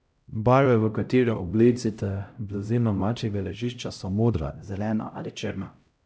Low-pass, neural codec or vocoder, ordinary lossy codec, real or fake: none; codec, 16 kHz, 0.5 kbps, X-Codec, HuBERT features, trained on LibriSpeech; none; fake